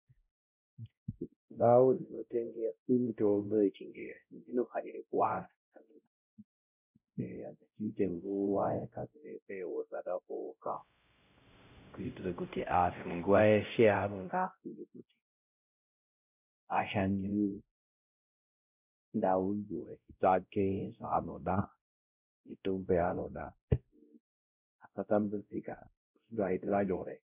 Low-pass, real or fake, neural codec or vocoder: 3.6 kHz; fake; codec, 16 kHz, 0.5 kbps, X-Codec, WavLM features, trained on Multilingual LibriSpeech